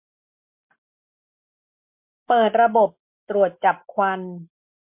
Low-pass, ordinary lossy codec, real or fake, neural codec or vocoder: 3.6 kHz; MP3, 32 kbps; real; none